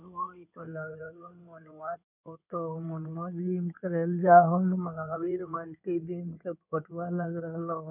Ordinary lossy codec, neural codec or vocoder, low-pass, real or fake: none; codec, 16 kHz in and 24 kHz out, 2.2 kbps, FireRedTTS-2 codec; 3.6 kHz; fake